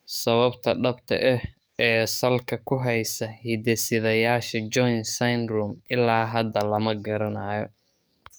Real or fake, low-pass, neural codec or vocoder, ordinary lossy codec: fake; none; codec, 44.1 kHz, 7.8 kbps, DAC; none